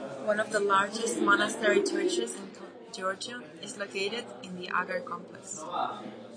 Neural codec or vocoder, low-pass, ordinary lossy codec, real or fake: none; 9.9 kHz; AAC, 32 kbps; real